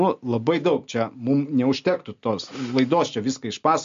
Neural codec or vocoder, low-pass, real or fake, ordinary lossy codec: none; 7.2 kHz; real; MP3, 48 kbps